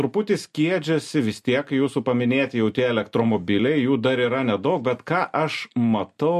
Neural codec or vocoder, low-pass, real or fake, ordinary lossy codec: none; 14.4 kHz; real; MP3, 64 kbps